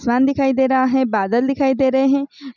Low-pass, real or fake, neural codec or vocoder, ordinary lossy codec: 7.2 kHz; real; none; none